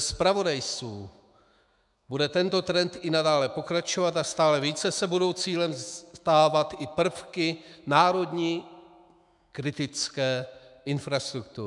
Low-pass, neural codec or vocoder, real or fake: 10.8 kHz; autoencoder, 48 kHz, 128 numbers a frame, DAC-VAE, trained on Japanese speech; fake